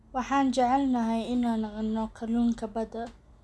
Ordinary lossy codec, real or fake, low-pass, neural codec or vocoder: none; real; none; none